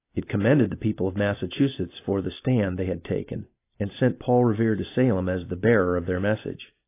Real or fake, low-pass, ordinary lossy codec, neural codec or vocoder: real; 3.6 kHz; AAC, 24 kbps; none